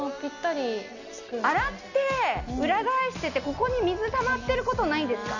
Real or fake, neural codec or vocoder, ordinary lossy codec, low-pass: real; none; none; 7.2 kHz